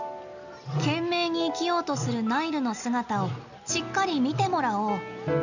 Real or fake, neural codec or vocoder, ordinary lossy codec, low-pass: real; none; none; 7.2 kHz